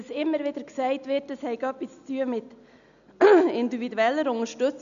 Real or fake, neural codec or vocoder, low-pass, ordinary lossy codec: real; none; 7.2 kHz; none